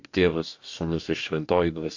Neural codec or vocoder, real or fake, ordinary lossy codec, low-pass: codec, 44.1 kHz, 2.6 kbps, DAC; fake; AAC, 48 kbps; 7.2 kHz